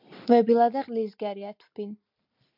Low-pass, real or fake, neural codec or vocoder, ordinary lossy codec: 5.4 kHz; real; none; MP3, 48 kbps